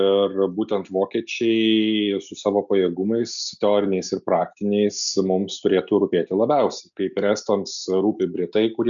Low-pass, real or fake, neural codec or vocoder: 7.2 kHz; real; none